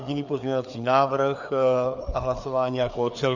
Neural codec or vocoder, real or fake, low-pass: codec, 16 kHz, 4 kbps, FreqCodec, larger model; fake; 7.2 kHz